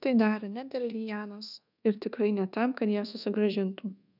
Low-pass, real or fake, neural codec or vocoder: 5.4 kHz; fake; codec, 24 kHz, 1.2 kbps, DualCodec